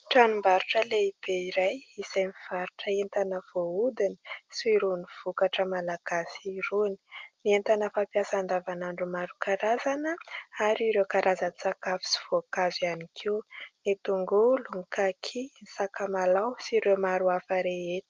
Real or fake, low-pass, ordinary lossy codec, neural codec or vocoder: real; 7.2 kHz; Opus, 32 kbps; none